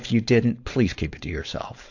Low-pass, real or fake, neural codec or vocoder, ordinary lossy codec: 7.2 kHz; fake; codec, 16 kHz, 4.8 kbps, FACodec; AAC, 48 kbps